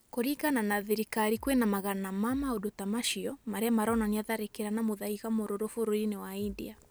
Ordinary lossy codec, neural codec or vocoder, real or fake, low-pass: none; none; real; none